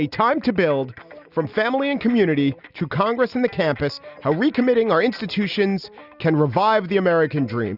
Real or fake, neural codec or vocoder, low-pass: real; none; 5.4 kHz